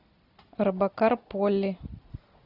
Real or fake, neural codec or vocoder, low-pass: real; none; 5.4 kHz